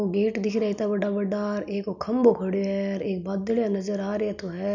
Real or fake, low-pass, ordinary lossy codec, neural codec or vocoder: real; 7.2 kHz; none; none